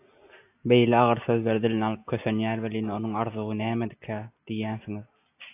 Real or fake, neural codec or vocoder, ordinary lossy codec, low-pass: real; none; AAC, 24 kbps; 3.6 kHz